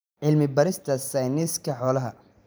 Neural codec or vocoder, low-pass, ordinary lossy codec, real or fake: vocoder, 44.1 kHz, 128 mel bands every 512 samples, BigVGAN v2; none; none; fake